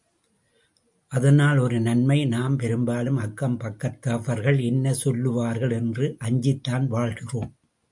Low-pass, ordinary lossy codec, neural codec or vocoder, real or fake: 10.8 kHz; MP3, 64 kbps; none; real